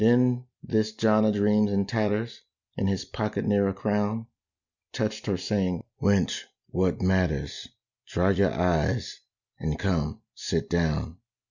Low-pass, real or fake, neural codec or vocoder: 7.2 kHz; real; none